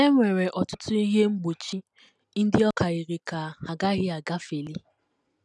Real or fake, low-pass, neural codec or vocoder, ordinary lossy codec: real; 10.8 kHz; none; none